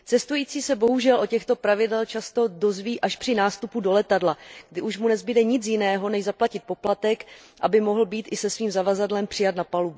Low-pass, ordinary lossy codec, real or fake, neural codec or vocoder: none; none; real; none